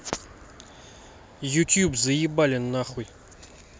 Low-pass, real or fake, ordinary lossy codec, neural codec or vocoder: none; real; none; none